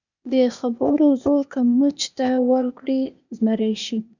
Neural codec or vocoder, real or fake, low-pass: codec, 16 kHz, 0.8 kbps, ZipCodec; fake; 7.2 kHz